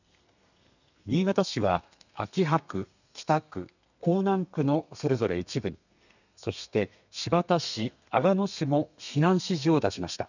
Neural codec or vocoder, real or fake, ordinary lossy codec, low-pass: codec, 32 kHz, 1.9 kbps, SNAC; fake; none; 7.2 kHz